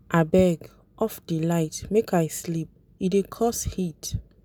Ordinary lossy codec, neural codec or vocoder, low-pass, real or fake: none; none; none; real